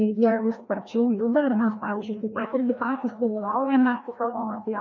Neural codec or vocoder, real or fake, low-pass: codec, 16 kHz, 1 kbps, FreqCodec, larger model; fake; 7.2 kHz